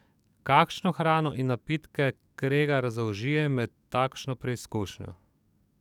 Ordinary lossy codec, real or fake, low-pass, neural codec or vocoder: none; fake; 19.8 kHz; codec, 44.1 kHz, 7.8 kbps, DAC